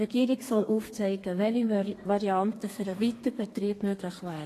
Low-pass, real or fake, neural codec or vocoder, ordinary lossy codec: 14.4 kHz; fake; codec, 32 kHz, 1.9 kbps, SNAC; AAC, 48 kbps